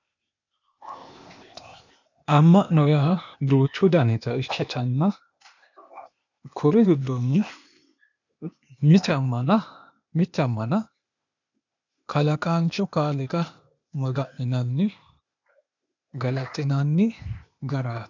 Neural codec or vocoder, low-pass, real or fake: codec, 16 kHz, 0.8 kbps, ZipCodec; 7.2 kHz; fake